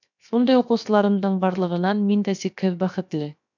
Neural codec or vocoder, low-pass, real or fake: codec, 16 kHz, 0.7 kbps, FocalCodec; 7.2 kHz; fake